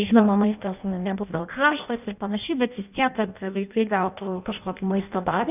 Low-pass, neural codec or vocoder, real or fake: 3.6 kHz; codec, 16 kHz in and 24 kHz out, 0.6 kbps, FireRedTTS-2 codec; fake